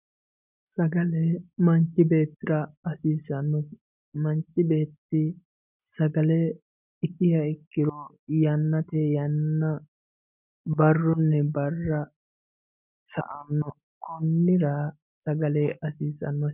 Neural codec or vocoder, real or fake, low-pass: none; real; 3.6 kHz